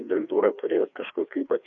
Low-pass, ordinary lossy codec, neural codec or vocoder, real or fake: 7.2 kHz; MP3, 96 kbps; codec, 16 kHz, 2 kbps, FreqCodec, larger model; fake